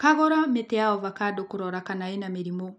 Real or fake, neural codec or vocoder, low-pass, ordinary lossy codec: real; none; none; none